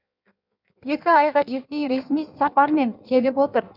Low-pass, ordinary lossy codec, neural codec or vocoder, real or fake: 5.4 kHz; none; codec, 16 kHz in and 24 kHz out, 1.1 kbps, FireRedTTS-2 codec; fake